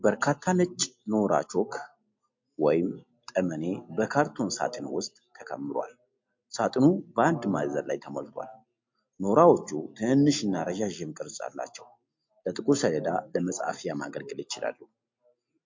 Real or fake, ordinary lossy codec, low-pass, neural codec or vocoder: real; MP3, 48 kbps; 7.2 kHz; none